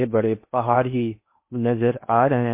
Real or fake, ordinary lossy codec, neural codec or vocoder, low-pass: fake; MP3, 24 kbps; codec, 16 kHz in and 24 kHz out, 0.8 kbps, FocalCodec, streaming, 65536 codes; 3.6 kHz